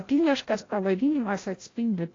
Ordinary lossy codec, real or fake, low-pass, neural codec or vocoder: AAC, 32 kbps; fake; 7.2 kHz; codec, 16 kHz, 0.5 kbps, FreqCodec, larger model